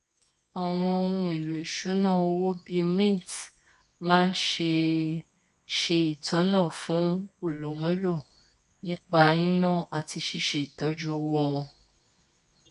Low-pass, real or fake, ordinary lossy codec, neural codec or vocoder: 10.8 kHz; fake; none; codec, 24 kHz, 0.9 kbps, WavTokenizer, medium music audio release